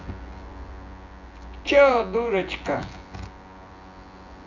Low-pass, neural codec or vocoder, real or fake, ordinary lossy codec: 7.2 kHz; vocoder, 24 kHz, 100 mel bands, Vocos; fake; Opus, 64 kbps